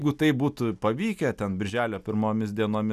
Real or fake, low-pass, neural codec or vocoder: real; 14.4 kHz; none